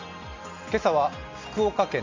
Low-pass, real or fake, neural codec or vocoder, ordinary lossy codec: 7.2 kHz; real; none; MP3, 48 kbps